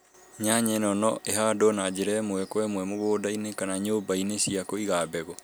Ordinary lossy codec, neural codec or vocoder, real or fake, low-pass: none; none; real; none